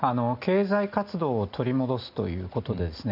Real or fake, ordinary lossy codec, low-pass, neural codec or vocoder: real; none; 5.4 kHz; none